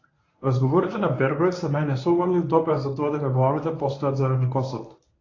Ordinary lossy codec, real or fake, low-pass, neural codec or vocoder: AAC, 32 kbps; fake; 7.2 kHz; codec, 24 kHz, 0.9 kbps, WavTokenizer, medium speech release version 1